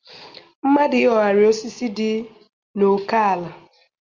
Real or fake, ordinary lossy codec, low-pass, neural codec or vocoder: real; Opus, 32 kbps; 7.2 kHz; none